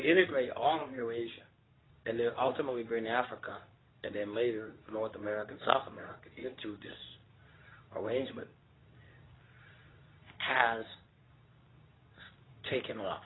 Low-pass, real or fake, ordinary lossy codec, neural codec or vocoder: 7.2 kHz; fake; AAC, 16 kbps; codec, 24 kHz, 0.9 kbps, WavTokenizer, medium speech release version 2